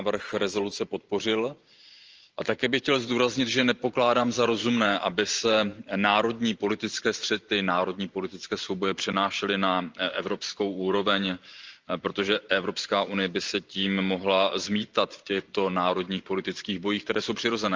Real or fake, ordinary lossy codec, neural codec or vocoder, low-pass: fake; Opus, 24 kbps; vocoder, 44.1 kHz, 128 mel bands every 512 samples, BigVGAN v2; 7.2 kHz